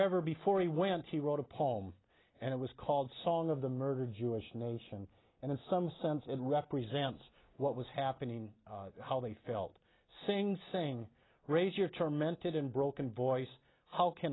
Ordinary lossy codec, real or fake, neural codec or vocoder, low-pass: AAC, 16 kbps; real; none; 7.2 kHz